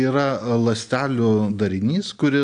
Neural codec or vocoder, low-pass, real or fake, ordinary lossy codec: none; 9.9 kHz; real; AAC, 64 kbps